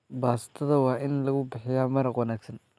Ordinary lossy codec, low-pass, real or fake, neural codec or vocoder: none; none; real; none